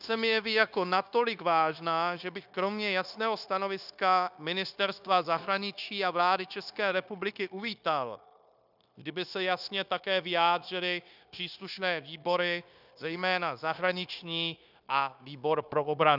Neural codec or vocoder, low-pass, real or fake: codec, 16 kHz, 0.9 kbps, LongCat-Audio-Codec; 5.4 kHz; fake